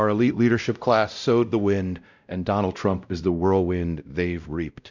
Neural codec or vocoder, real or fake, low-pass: codec, 16 kHz, 0.5 kbps, X-Codec, WavLM features, trained on Multilingual LibriSpeech; fake; 7.2 kHz